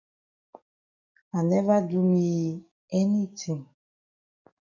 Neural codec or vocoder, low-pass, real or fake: codec, 44.1 kHz, 7.8 kbps, DAC; 7.2 kHz; fake